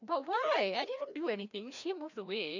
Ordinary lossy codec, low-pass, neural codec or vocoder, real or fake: none; 7.2 kHz; codec, 16 kHz, 1 kbps, FreqCodec, larger model; fake